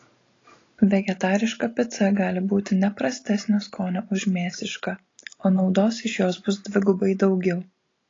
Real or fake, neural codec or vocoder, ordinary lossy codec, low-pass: real; none; AAC, 32 kbps; 7.2 kHz